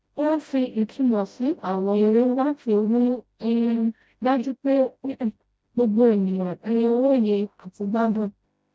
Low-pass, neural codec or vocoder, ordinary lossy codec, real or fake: none; codec, 16 kHz, 0.5 kbps, FreqCodec, smaller model; none; fake